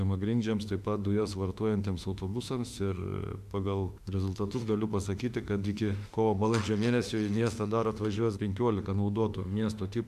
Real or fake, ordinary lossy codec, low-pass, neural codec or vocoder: fake; AAC, 96 kbps; 14.4 kHz; autoencoder, 48 kHz, 32 numbers a frame, DAC-VAE, trained on Japanese speech